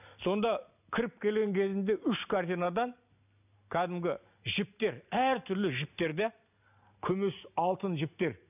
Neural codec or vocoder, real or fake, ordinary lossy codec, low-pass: none; real; none; 3.6 kHz